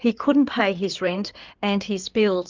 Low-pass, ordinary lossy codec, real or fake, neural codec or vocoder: 7.2 kHz; Opus, 24 kbps; fake; codec, 16 kHz in and 24 kHz out, 2.2 kbps, FireRedTTS-2 codec